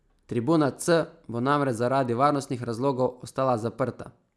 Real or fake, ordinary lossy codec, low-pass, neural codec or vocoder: real; none; none; none